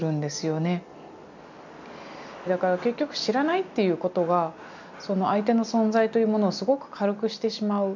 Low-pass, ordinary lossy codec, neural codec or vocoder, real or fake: 7.2 kHz; none; none; real